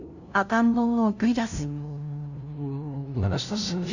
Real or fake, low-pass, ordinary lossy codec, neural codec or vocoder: fake; 7.2 kHz; none; codec, 16 kHz, 0.5 kbps, FunCodec, trained on LibriTTS, 25 frames a second